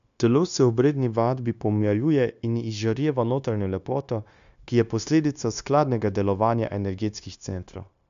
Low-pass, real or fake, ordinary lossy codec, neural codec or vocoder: 7.2 kHz; fake; AAC, 96 kbps; codec, 16 kHz, 0.9 kbps, LongCat-Audio-Codec